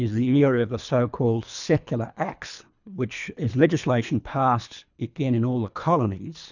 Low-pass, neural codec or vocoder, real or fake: 7.2 kHz; codec, 24 kHz, 3 kbps, HILCodec; fake